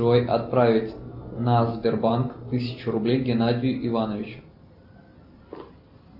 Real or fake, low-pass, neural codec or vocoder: real; 5.4 kHz; none